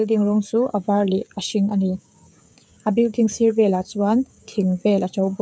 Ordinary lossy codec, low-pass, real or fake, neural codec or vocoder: none; none; fake; codec, 16 kHz, 16 kbps, FreqCodec, smaller model